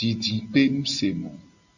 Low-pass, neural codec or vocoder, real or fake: 7.2 kHz; none; real